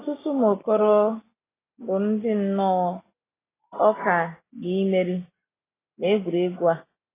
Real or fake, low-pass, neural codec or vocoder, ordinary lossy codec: real; 3.6 kHz; none; AAC, 16 kbps